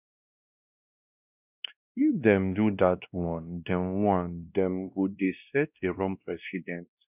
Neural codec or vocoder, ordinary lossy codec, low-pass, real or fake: codec, 16 kHz, 1 kbps, X-Codec, WavLM features, trained on Multilingual LibriSpeech; none; 3.6 kHz; fake